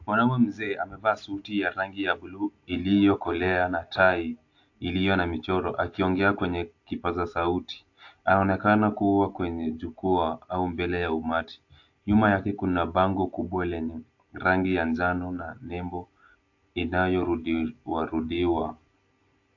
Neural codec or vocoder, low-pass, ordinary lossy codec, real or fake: none; 7.2 kHz; AAC, 48 kbps; real